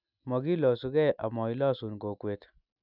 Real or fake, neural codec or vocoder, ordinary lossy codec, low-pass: real; none; none; 5.4 kHz